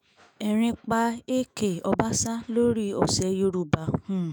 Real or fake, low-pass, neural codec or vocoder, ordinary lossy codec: fake; none; autoencoder, 48 kHz, 128 numbers a frame, DAC-VAE, trained on Japanese speech; none